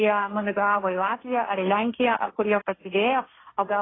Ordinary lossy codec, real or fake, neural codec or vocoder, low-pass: AAC, 16 kbps; fake; codec, 16 kHz, 1.1 kbps, Voila-Tokenizer; 7.2 kHz